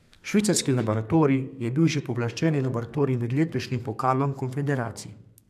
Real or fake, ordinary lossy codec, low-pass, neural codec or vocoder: fake; none; 14.4 kHz; codec, 32 kHz, 1.9 kbps, SNAC